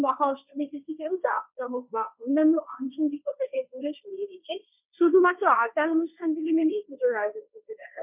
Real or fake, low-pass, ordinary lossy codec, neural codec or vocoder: fake; 3.6 kHz; none; codec, 16 kHz, 1.1 kbps, Voila-Tokenizer